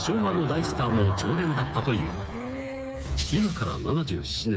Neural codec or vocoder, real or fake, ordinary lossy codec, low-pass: codec, 16 kHz, 4 kbps, FreqCodec, smaller model; fake; none; none